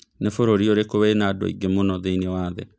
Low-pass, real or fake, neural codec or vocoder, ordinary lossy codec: none; real; none; none